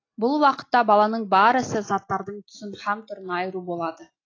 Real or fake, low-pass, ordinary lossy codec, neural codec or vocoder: real; 7.2 kHz; AAC, 32 kbps; none